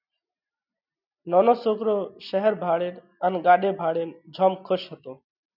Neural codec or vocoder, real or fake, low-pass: none; real; 5.4 kHz